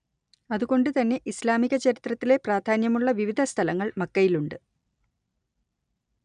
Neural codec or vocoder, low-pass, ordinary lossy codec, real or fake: none; 9.9 kHz; none; real